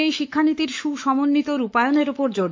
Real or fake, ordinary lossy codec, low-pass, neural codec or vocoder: fake; MP3, 48 kbps; 7.2 kHz; autoencoder, 48 kHz, 128 numbers a frame, DAC-VAE, trained on Japanese speech